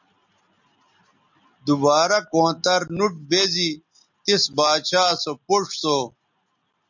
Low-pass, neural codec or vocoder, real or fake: 7.2 kHz; none; real